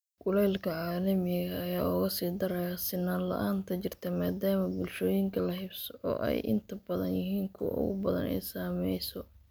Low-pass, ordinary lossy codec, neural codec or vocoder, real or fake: none; none; none; real